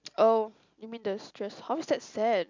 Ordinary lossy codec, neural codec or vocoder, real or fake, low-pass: MP3, 64 kbps; none; real; 7.2 kHz